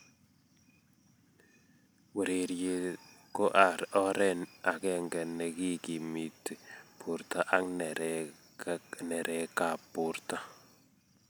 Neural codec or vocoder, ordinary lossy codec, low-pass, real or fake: none; none; none; real